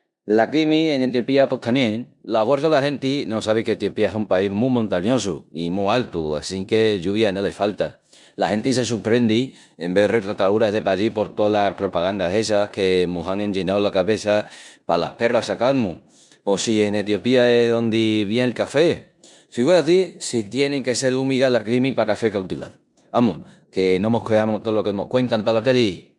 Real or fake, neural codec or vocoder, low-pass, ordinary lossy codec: fake; codec, 16 kHz in and 24 kHz out, 0.9 kbps, LongCat-Audio-Codec, four codebook decoder; 10.8 kHz; none